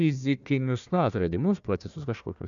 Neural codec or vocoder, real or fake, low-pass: codec, 16 kHz, 1 kbps, FunCodec, trained on Chinese and English, 50 frames a second; fake; 7.2 kHz